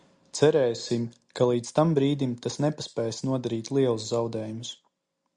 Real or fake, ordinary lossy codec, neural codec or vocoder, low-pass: real; Opus, 64 kbps; none; 9.9 kHz